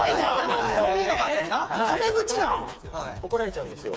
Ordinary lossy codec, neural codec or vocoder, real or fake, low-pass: none; codec, 16 kHz, 4 kbps, FreqCodec, smaller model; fake; none